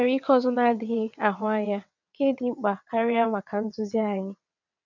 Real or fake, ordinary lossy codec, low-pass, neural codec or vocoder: fake; none; 7.2 kHz; vocoder, 22.05 kHz, 80 mel bands, WaveNeXt